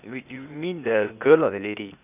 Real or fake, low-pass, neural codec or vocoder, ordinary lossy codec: fake; 3.6 kHz; codec, 16 kHz, 0.8 kbps, ZipCodec; none